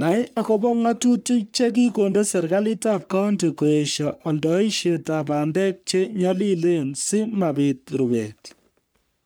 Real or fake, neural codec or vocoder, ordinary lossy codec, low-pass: fake; codec, 44.1 kHz, 3.4 kbps, Pupu-Codec; none; none